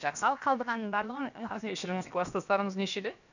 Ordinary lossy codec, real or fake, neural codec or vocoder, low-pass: none; fake; codec, 16 kHz, about 1 kbps, DyCAST, with the encoder's durations; 7.2 kHz